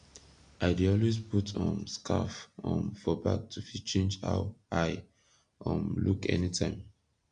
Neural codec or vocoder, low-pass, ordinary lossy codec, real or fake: none; 9.9 kHz; none; real